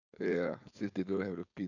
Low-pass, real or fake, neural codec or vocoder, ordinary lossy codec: 7.2 kHz; fake; codec, 16 kHz, 4.8 kbps, FACodec; none